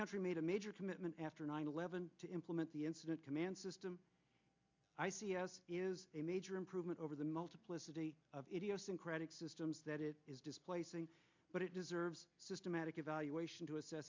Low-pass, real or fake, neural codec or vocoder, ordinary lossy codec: 7.2 kHz; real; none; MP3, 64 kbps